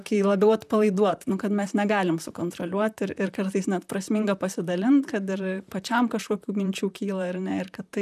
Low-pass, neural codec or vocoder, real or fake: 14.4 kHz; vocoder, 44.1 kHz, 128 mel bands, Pupu-Vocoder; fake